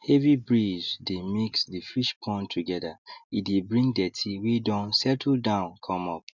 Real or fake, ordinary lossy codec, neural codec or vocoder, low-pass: real; none; none; 7.2 kHz